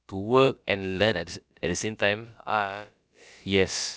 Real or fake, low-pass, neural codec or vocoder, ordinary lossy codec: fake; none; codec, 16 kHz, about 1 kbps, DyCAST, with the encoder's durations; none